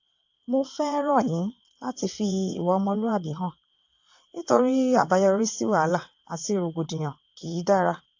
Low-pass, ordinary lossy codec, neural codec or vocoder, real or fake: 7.2 kHz; AAC, 48 kbps; vocoder, 22.05 kHz, 80 mel bands, WaveNeXt; fake